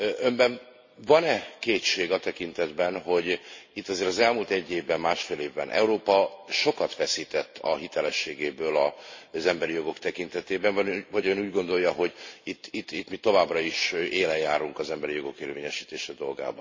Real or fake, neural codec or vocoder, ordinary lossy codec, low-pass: real; none; MP3, 32 kbps; 7.2 kHz